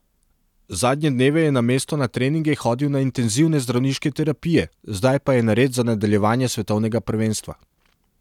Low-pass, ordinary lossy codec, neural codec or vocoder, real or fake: 19.8 kHz; none; none; real